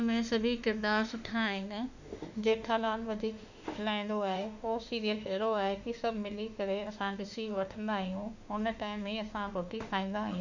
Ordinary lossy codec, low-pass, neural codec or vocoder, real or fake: none; 7.2 kHz; autoencoder, 48 kHz, 32 numbers a frame, DAC-VAE, trained on Japanese speech; fake